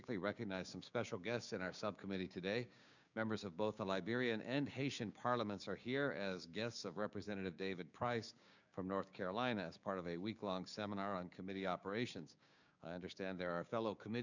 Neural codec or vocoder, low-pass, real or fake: codec, 16 kHz, 6 kbps, DAC; 7.2 kHz; fake